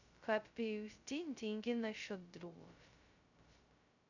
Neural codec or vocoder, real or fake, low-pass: codec, 16 kHz, 0.2 kbps, FocalCodec; fake; 7.2 kHz